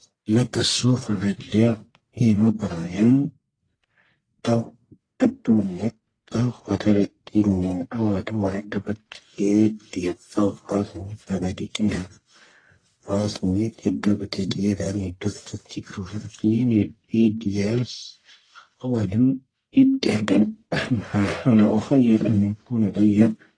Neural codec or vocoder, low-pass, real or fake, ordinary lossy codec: codec, 44.1 kHz, 1.7 kbps, Pupu-Codec; 9.9 kHz; fake; AAC, 32 kbps